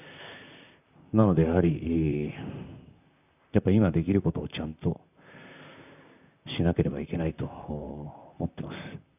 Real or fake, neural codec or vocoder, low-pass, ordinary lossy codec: fake; vocoder, 44.1 kHz, 128 mel bands, Pupu-Vocoder; 3.6 kHz; none